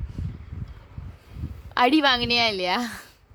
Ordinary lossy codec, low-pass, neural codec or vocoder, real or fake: none; none; vocoder, 44.1 kHz, 128 mel bands, Pupu-Vocoder; fake